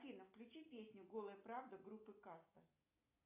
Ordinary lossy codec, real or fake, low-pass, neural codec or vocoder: AAC, 32 kbps; real; 3.6 kHz; none